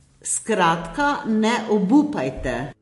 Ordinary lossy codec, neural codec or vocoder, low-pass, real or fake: MP3, 48 kbps; none; 14.4 kHz; real